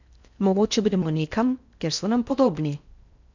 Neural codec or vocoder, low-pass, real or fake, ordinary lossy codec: codec, 16 kHz in and 24 kHz out, 0.6 kbps, FocalCodec, streaming, 4096 codes; 7.2 kHz; fake; none